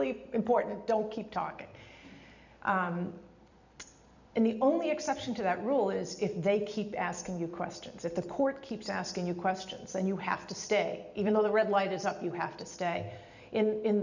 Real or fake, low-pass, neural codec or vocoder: fake; 7.2 kHz; vocoder, 44.1 kHz, 128 mel bands every 256 samples, BigVGAN v2